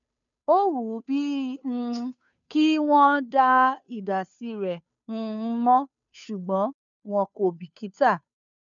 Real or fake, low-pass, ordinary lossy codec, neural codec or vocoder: fake; 7.2 kHz; MP3, 96 kbps; codec, 16 kHz, 2 kbps, FunCodec, trained on Chinese and English, 25 frames a second